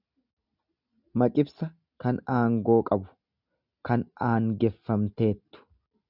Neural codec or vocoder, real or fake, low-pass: none; real; 5.4 kHz